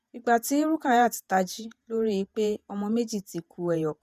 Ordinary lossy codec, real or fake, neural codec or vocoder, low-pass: none; fake; vocoder, 48 kHz, 128 mel bands, Vocos; 14.4 kHz